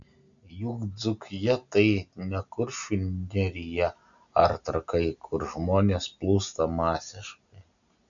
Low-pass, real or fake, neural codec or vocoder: 7.2 kHz; real; none